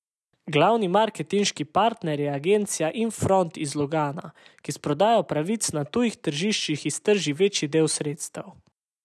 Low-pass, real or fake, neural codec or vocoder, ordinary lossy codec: none; real; none; none